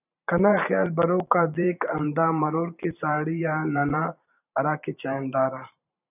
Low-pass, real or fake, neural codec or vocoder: 3.6 kHz; fake; vocoder, 44.1 kHz, 128 mel bands every 512 samples, BigVGAN v2